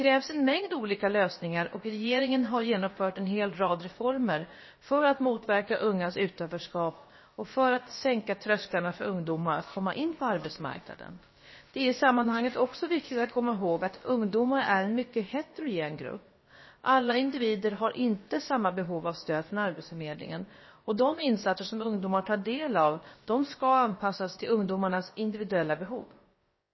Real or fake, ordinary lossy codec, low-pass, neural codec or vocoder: fake; MP3, 24 kbps; 7.2 kHz; codec, 16 kHz, about 1 kbps, DyCAST, with the encoder's durations